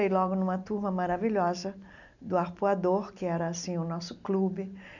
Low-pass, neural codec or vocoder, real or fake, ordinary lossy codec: 7.2 kHz; none; real; none